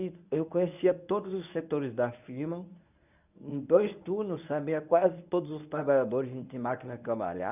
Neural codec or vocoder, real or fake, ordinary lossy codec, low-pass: codec, 24 kHz, 0.9 kbps, WavTokenizer, medium speech release version 1; fake; none; 3.6 kHz